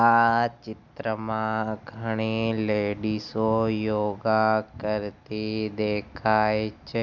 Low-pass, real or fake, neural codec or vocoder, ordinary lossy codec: 7.2 kHz; real; none; none